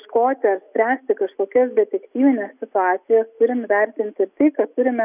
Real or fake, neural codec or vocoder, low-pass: real; none; 3.6 kHz